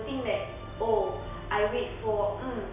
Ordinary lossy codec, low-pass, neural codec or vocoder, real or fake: none; 3.6 kHz; none; real